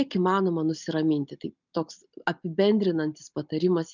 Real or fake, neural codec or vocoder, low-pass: real; none; 7.2 kHz